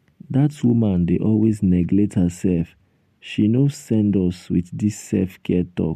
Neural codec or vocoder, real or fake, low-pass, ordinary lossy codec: none; real; 14.4 kHz; MP3, 64 kbps